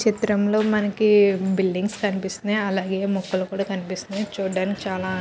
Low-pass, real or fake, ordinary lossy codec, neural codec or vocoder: none; real; none; none